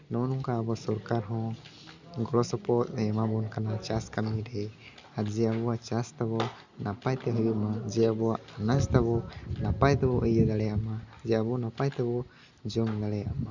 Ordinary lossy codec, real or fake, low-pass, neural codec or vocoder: Opus, 64 kbps; real; 7.2 kHz; none